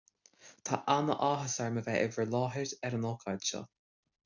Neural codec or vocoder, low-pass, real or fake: none; 7.2 kHz; real